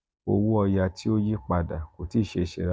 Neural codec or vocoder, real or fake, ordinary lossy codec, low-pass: none; real; none; none